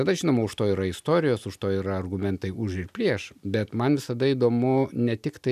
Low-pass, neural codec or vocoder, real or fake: 14.4 kHz; none; real